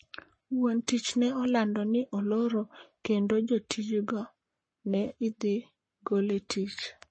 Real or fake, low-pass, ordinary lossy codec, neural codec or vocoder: fake; 9.9 kHz; MP3, 32 kbps; codec, 44.1 kHz, 7.8 kbps, Pupu-Codec